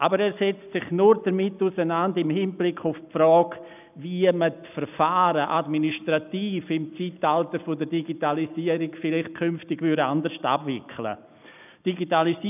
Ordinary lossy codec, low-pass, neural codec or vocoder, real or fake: none; 3.6 kHz; none; real